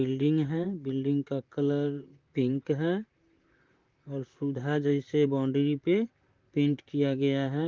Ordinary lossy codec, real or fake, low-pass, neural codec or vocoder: Opus, 32 kbps; fake; 7.2 kHz; vocoder, 44.1 kHz, 128 mel bands, Pupu-Vocoder